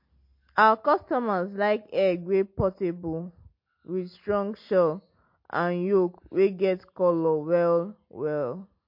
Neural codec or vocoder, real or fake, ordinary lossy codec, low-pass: none; real; MP3, 32 kbps; 5.4 kHz